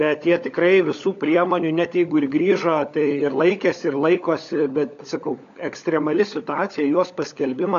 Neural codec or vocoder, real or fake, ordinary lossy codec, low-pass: codec, 16 kHz, 16 kbps, FunCodec, trained on LibriTTS, 50 frames a second; fake; AAC, 64 kbps; 7.2 kHz